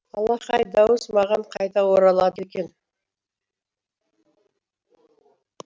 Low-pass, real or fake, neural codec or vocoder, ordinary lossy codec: none; real; none; none